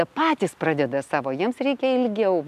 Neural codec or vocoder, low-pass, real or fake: none; 14.4 kHz; real